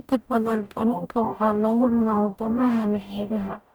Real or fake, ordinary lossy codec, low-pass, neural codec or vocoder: fake; none; none; codec, 44.1 kHz, 0.9 kbps, DAC